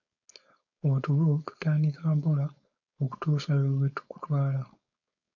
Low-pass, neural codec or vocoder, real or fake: 7.2 kHz; codec, 16 kHz, 4.8 kbps, FACodec; fake